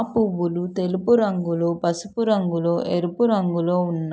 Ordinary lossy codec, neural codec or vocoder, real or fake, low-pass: none; none; real; none